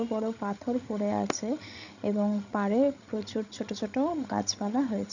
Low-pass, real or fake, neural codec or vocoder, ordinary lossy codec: 7.2 kHz; fake; codec, 16 kHz, 16 kbps, FreqCodec, larger model; none